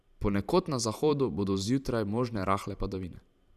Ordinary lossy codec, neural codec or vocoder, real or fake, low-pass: none; vocoder, 44.1 kHz, 128 mel bands every 512 samples, BigVGAN v2; fake; 14.4 kHz